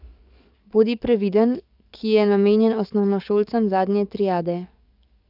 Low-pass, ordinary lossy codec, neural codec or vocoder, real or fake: 5.4 kHz; none; codec, 44.1 kHz, 7.8 kbps, DAC; fake